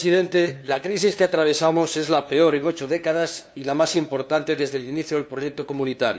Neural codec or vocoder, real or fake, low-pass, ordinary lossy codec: codec, 16 kHz, 2 kbps, FunCodec, trained on LibriTTS, 25 frames a second; fake; none; none